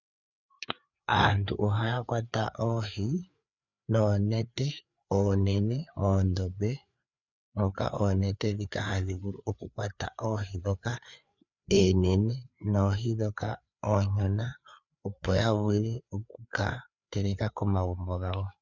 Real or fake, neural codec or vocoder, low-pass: fake; codec, 16 kHz, 4 kbps, FreqCodec, larger model; 7.2 kHz